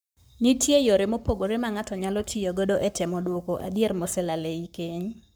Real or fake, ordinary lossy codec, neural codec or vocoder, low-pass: fake; none; codec, 44.1 kHz, 7.8 kbps, Pupu-Codec; none